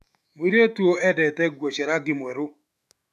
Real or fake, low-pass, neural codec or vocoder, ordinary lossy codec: fake; 14.4 kHz; autoencoder, 48 kHz, 128 numbers a frame, DAC-VAE, trained on Japanese speech; none